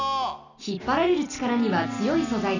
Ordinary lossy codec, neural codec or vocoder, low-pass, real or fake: none; none; 7.2 kHz; real